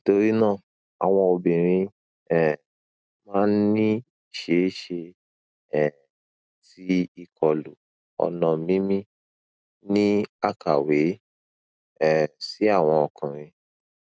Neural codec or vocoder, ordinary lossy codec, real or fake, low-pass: none; none; real; none